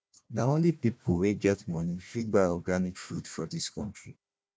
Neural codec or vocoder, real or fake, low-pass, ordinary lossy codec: codec, 16 kHz, 1 kbps, FunCodec, trained on Chinese and English, 50 frames a second; fake; none; none